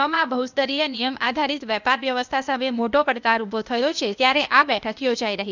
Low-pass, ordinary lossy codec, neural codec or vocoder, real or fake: 7.2 kHz; none; codec, 16 kHz, 0.8 kbps, ZipCodec; fake